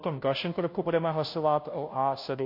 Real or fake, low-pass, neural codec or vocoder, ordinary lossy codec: fake; 5.4 kHz; codec, 16 kHz, 0.5 kbps, FunCodec, trained on Chinese and English, 25 frames a second; MP3, 32 kbps